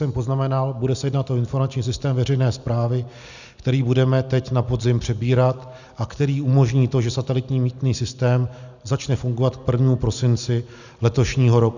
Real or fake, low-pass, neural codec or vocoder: real; 7.2 kHz; none